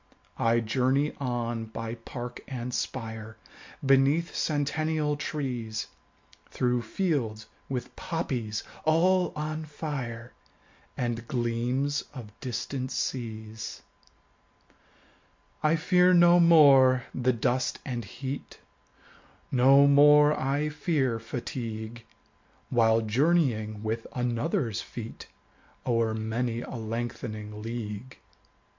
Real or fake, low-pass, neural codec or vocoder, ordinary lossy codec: real; 7.2 kHz; none; MP3, 48 kbps